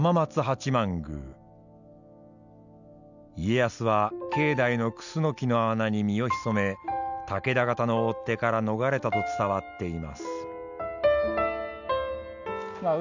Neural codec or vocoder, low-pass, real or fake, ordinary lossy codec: none; 7.2 kHz; real; none